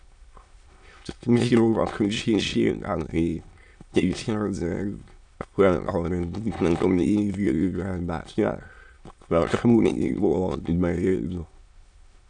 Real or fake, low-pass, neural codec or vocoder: fake; 9.9 kHz; autoencoder, 22.05 kHz, a latent of 192 numbers a frame, VITS, trained on many speakers